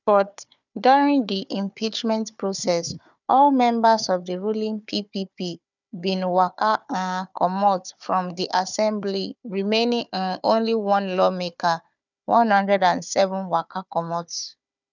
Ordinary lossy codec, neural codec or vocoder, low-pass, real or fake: none; codec, 16 kHz, 4 kbps, FunCodec, trained on Chinese and English, 50 frames a second; 7.2 kHz; fake